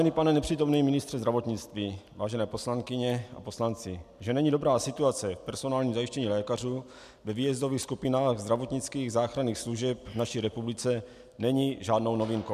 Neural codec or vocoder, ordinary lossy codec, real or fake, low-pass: none; MP3, 96 kbps; real; 14.4 kHz